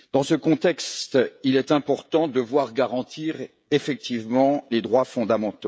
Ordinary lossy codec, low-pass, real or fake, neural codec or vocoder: none; none; fake; codec, 16 kHz, 16 kbps, FreqCodec, smaller model